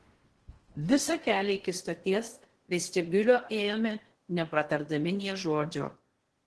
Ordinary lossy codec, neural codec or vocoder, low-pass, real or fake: Opus, 16 kbps; codec, 16 kHz in and 24 kHz out, 0.8 kbps, FocalCodec, streaming, 65536 codes; 10.8 kHz; fake